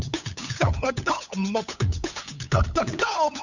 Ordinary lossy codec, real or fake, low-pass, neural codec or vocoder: none; fake; 7.2 kHz; codec, 16 kHz, 8 kbps, FunCodec, trained on LibriTTS, 25 frames a second